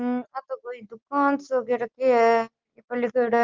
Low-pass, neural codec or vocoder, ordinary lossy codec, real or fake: 7.2 kHz; none; Opus, 16 kbps; real